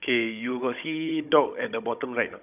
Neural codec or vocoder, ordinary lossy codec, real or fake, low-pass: codec, 16 kHz, 16 kbps, FunCodec, trained on Chinese and English, 50 frames a second; none; fake; 3.6 kHz